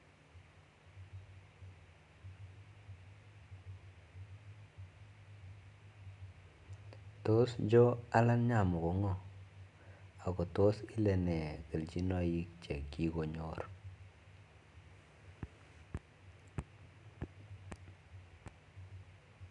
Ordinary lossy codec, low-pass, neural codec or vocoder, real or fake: none; 10.8 kHz; none; real